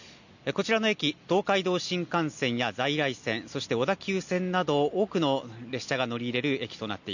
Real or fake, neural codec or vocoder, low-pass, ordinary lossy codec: real; none; 7.2 kHz; none